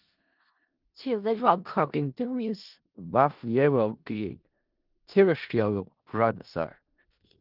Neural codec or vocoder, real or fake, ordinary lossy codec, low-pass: codec, 16 kHz in and 24 kHz out, 0.4 kbps, LongCat-Audio-Codec, four codebook decoder; fake; Opus, 32 kbps; 5.4 kHz